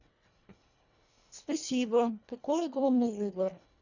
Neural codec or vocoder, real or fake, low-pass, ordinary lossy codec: codec, 24 kHz, 1.5 kbps, HILCodec; fake; 7.2 kHz; none